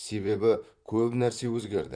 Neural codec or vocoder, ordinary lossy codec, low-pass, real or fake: vocoder, 44.1 kHz, 128 mel bands, Pupu-Vocoder; none; 9.9 kHz; fake